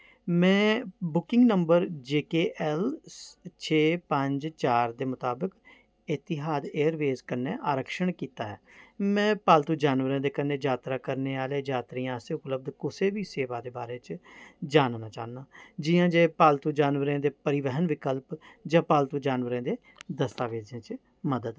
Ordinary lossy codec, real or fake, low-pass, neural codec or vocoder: none; real; none; none